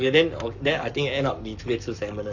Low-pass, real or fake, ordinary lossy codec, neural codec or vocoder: 7.2 kHz; fake; none; codec, 44.1 kHz, 7.8 kbps, Pupu-Codec